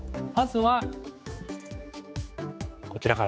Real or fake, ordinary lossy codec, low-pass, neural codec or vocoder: fake; none; none; codec, 16 kHz, 4 kbps, X-Codec, HuBERT features, trained on general audio